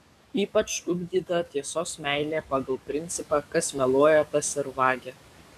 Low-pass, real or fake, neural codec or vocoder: 14.4 kHz; fake; codec, 44.1 kHz, 7.8 kbps, DAC